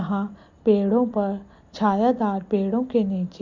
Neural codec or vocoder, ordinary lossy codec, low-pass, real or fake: none; MP3, 48 kbps; 7.2 kHz; real